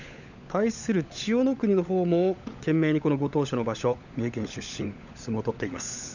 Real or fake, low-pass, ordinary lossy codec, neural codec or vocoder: fake; 7.2 kHz; none; codec, 16 kHz, 16 kbps, FunCodec, trained on LibriTTS, 50 frames a second